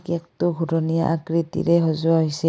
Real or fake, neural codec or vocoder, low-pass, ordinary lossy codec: real; none; none; none